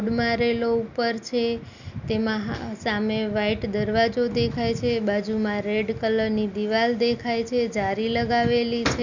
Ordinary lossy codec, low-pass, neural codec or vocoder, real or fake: none; 7.2 kHz; none; real